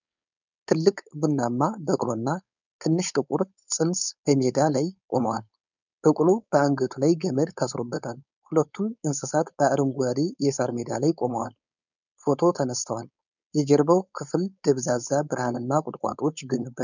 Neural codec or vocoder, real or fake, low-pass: codec, 16 kHz, 4.8 kbps, FACodec; fake; 7.2 kHz